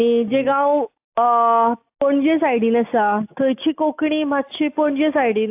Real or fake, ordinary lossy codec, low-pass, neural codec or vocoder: real; AAC, 32 kbps; 3.6 kHz; none